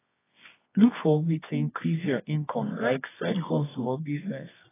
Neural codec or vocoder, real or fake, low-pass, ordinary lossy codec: codec, 24 kHz, 0.9 kbps, WavTokenizer, medium music audio release; fake; 3.6 kHz; AAC, 16 kbps